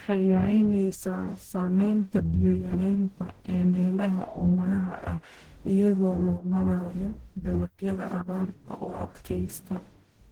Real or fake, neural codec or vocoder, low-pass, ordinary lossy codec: fake; codec, 44.1 kHz, 0.9 kbps, DAC; 19.8 kHz; Opus, 16 kbps